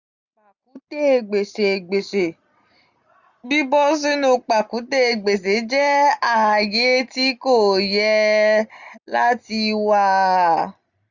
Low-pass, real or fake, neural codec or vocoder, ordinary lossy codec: 7.2 kHz; real; none; none